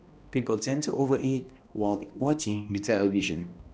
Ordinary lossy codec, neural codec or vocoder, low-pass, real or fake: none; codec, 16 kHz, 1 kbps, X-Codec, HuBERT features, trained on balanced general audio; none; fake